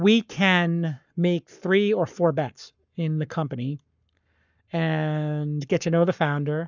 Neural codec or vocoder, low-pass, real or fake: codec, 44.1 kHz, 7.8 kbps, Pupu-Codec; 7.2 kHz; fake